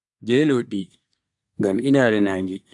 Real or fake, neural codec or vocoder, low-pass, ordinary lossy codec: fake; codec, 24 kHz, 1 kbps, SNAC; 10.8 kHz; MP3, 96 kbps